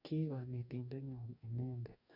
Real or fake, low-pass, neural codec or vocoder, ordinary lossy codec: fake; 5.4 kHz; codec, 44.1 kHz, 2.6 kbps, DAC; none